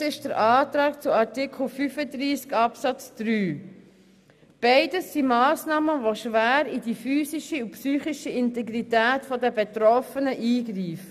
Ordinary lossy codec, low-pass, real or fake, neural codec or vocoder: none; 14.4 kHz; real; none